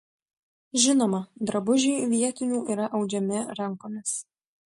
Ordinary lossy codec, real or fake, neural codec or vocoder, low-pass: MP3, 48 kbps; real; none; 14.4 kHz